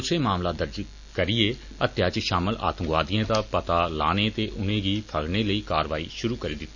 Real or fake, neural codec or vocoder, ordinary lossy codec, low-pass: real; none; none; 7.2 kHz